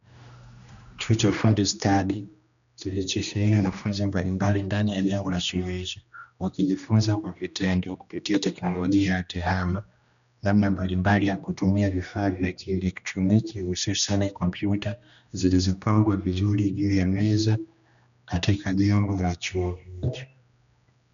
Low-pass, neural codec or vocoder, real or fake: 7.2 kHz; codec, 16 kHz, 1 kbps, X-Codec, HuBERT features, trained on general audio; fake